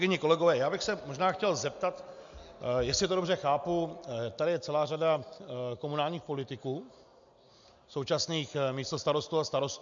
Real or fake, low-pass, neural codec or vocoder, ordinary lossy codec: real; 7.2 kHz; none; MP3, 64 kbps